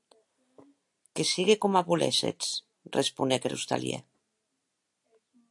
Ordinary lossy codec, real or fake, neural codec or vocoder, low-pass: AAC, 64 kbps; real; none; 10.8 kHz